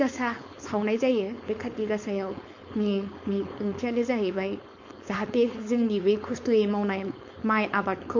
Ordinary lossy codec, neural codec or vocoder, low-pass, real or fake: MP3, 48 kbps; codec, 16 kHz, 4.8 kbps, FACodec; 7.2 kHz; fake